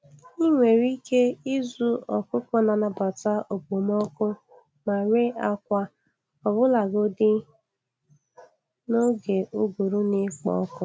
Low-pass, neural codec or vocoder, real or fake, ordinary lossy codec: none; none; real; none